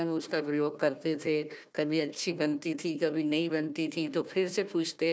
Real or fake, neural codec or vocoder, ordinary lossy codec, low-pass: fake; codec, 16 kHz, 1 kbps, FunCodec, trained on Chinese and English, 50 frames a second; none; none